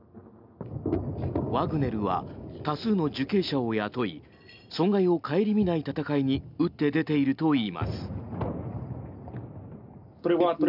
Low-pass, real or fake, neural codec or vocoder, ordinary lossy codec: 5.4 kHz; real; none; none